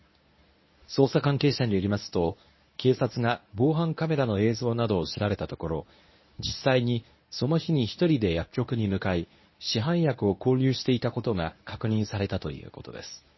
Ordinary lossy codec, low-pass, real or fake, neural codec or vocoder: MP3, 24 kbps; 7.2 kHz; fake; codec, 24 kHz, 0.9 kbps, WavTokenizer, medium speech release version 1